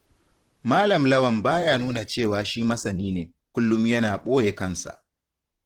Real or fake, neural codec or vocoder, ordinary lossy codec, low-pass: fake; vocoder, 44.1 kHz, 128 mel bands, Pupu-Vocoder; Opus, 16 kbps; 19.8 kHz